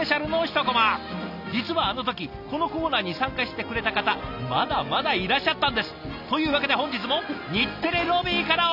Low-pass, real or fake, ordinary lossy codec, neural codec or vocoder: 5.4 kHz; real; none; none